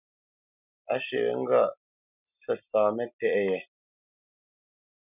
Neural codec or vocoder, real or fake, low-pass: none; real; 3.6 kHz